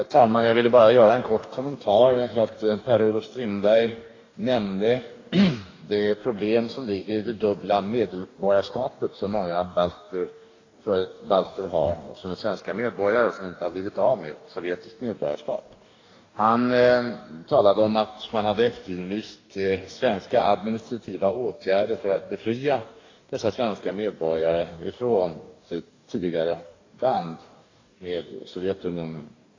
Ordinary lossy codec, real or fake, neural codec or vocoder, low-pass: AAC, 32 kbps; fake; codec, 44.1 kHz, 2.6 kbps, DAC; 7.2 kHz